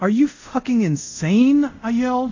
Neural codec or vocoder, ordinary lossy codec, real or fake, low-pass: codec, 24 kHz, 0.5 kbps, DualCodec; AAC, 48 kbps; fake; 7.2 kHz